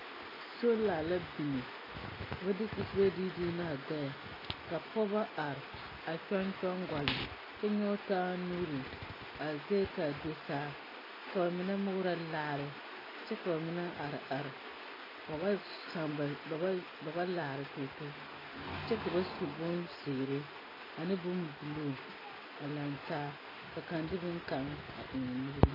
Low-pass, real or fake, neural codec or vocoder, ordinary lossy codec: 5.4 kHz; real; none; AAC, 24 kbps